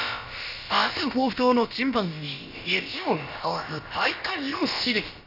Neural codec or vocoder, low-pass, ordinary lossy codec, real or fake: codec, 16 kHz, about 1 kbps, DyCAST, with the encoder's durations; 5.4 kHz; none; fake